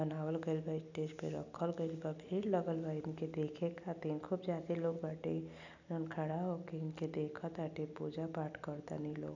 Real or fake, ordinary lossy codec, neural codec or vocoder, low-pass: real; none; none; 7.2 kHz